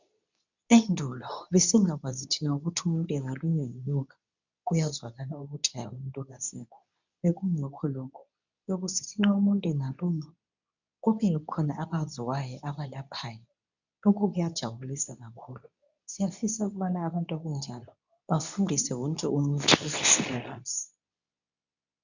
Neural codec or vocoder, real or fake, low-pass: codec, 24 kHz, 0.9 kbps, WavTokenizer, medium speech release version 2; fake; 7.2 kHz